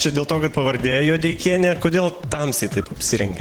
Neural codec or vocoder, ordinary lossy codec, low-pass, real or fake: vocoder, 44.1 kHz, 128 mel bands, Pupu-Vocoder; Opus, 16 kbps; 19.8 kHz; fake